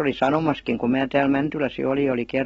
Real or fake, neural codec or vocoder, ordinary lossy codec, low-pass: real; none; AAC, 24 kbps; 19.8 kHz